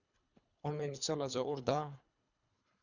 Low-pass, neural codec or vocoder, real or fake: 7.2 kHz; codec, 24 kHz, 3 kbps, HILCodec; fake